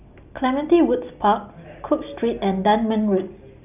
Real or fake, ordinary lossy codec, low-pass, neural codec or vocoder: real; none; 3.6 kHz; none